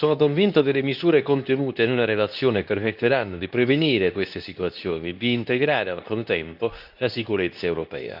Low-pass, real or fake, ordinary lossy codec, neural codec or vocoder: 5.4 kHz; fake; none; codec, 24 kHz, 0.9 kbps, WavTokenizer, medium speech release version 2